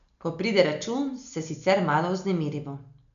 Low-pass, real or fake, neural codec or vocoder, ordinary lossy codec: 7.2 kHz; real; none; none